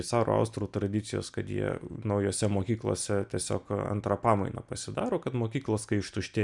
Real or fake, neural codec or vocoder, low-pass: real; none; 10.8 kHz